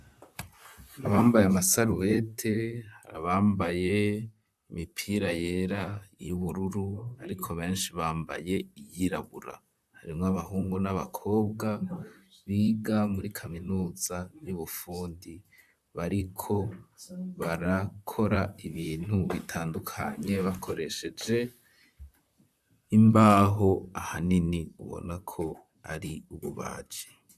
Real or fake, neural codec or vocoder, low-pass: fake; vocoder, 44.1 kHz, 128 mel bands, Pupu-Vocoder; 14.4 kHz